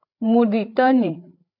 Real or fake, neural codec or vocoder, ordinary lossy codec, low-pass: fake; codec, 16 kHz, 8 kbps, FreqCodec, larger model; MP3, 48 kbps; 5.4 kHz